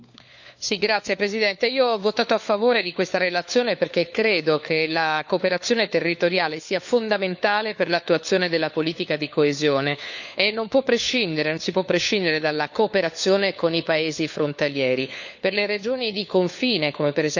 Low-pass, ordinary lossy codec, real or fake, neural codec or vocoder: 7.2 kHz; none; fake; codec, 16 kHz, 4 kbps, FunCodec, trained on LibriTTS, 50 frames a second